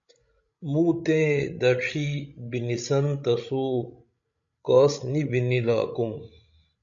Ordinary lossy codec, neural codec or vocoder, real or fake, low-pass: MP3, 64 kbps; codec, 16 kHz, 16 kbps, FreqCodec, larger model; fake; 7.2 kHz